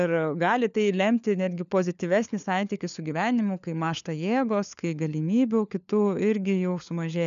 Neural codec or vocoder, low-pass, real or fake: codec, 16 kHz, 4 kbps, FunCodec, trained on LibriTTS, 50 frames a second; 7.2 kHz; fake